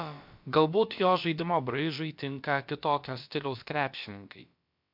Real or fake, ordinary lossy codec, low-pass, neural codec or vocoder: fake; MP3, 48 kbps; 5.4 kHz; codec, 16 kHz, about 1 kbps, DyCAST, with the encoder's durations